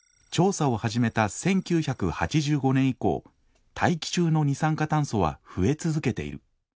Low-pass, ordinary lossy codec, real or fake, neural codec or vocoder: none; none; real; none